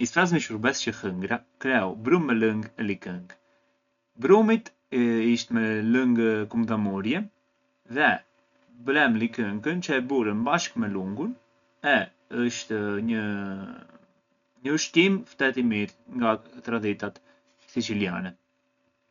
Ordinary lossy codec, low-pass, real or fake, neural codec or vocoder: none; 7.2 kHz; real; none